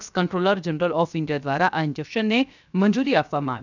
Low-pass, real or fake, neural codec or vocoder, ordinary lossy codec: 7.2 kHz; fake; codec, 16 kHz, 0.7 kbps, FocalCodec; none